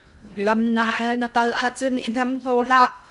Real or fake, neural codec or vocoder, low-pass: fake; codec, 16 kHz in and 24 kHz out, 0.6 kbps, FocalCodec, streaming, 2048 codes; 10.8 kHz